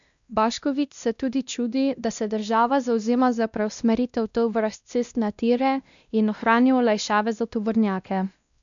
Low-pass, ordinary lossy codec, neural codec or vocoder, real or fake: 7.2 kHz; none; codec, 16 kHz, 1 kbps, X-Codec, WavLM features, trained on Multilingual LibriSpeech; fake